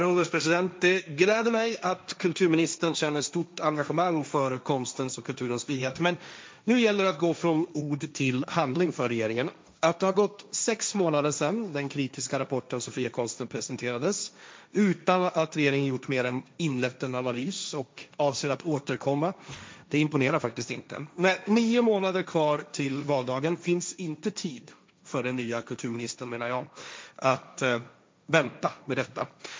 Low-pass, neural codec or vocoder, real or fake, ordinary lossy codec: none; codec, 16 kHz, 1.1 kbps, Voila-Tokenizer; fake; none